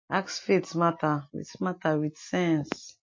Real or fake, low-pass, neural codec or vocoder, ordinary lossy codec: real; 7.2 kHz; none; MP3, 32 kbps